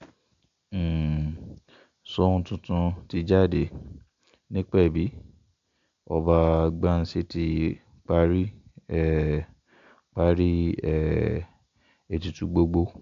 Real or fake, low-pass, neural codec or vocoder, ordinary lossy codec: real; 7.2 kHz; none; AAC, 64 kbps